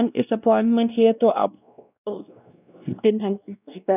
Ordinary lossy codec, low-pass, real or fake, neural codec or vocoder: none; 3.6 kHz; fake; codec, 16 kHz, 1 kbps, X-Codec, WavLM features, trained on Multilingual LibriSpeech